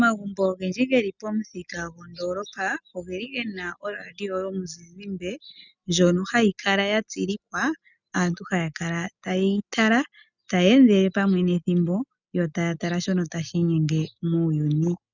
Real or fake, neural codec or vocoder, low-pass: real; none; 7.2 kHz